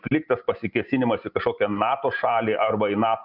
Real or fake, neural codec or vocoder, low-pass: real; none; 5.4 kHz